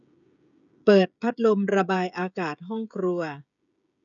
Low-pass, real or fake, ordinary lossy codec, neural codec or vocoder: 7.2 kHz; fake; none; codec, 16 kHz, 16 kbps, FreqCodec, smaller model